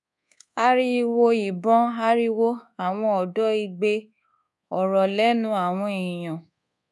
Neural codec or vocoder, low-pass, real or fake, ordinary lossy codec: codec, 24 kHz, 1.2 kbps, DualCodec; none; fake; none